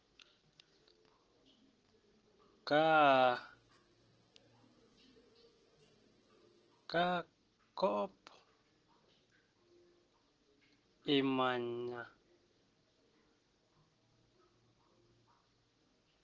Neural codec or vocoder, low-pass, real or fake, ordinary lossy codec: none; 7.2 kHz; real; Opus, 16 kbps